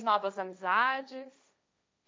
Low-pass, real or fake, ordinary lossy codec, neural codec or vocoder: none; fake; none; codec, 16 kHz, 1.1 kbps, Voila-Tokenizer